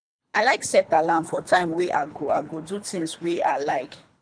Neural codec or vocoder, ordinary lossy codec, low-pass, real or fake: codec, 24 kHz, 3 kbps, HILCodec; none; 9.9 kHz; fake